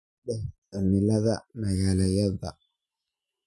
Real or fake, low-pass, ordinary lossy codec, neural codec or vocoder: real; none; none; none